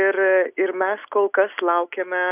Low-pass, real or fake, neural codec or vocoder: 3.6 kHz; real; none